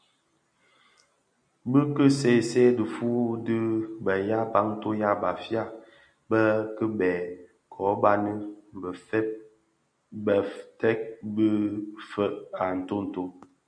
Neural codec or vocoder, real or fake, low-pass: none; real; 9.9 kHz